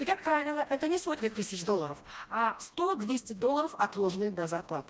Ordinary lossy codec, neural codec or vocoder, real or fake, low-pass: none; codec, 16 kHz, 1 kbps, FreqCodec, smaller model; fake; none